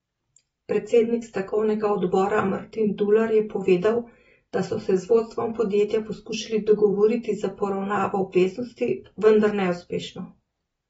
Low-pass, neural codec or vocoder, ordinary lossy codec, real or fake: 9.9 kHz; none; AAC, 24 kbps; real